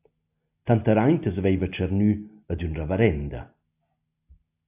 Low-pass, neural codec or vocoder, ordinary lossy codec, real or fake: 3.6 kHz; none; AAC, 32 kbps; real